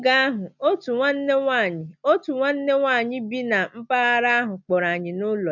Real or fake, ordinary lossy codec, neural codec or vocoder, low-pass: real; none; none; 7.2 kHz